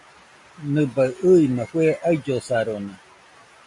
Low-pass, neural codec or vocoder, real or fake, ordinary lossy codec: 10.8 kHz; none; real; AAC, 64 kbps